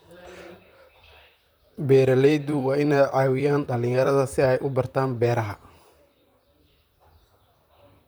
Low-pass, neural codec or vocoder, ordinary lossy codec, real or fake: none; vocoder, 44.1 kHz, 128 mel bands, Pupu-Vocoder; none; fake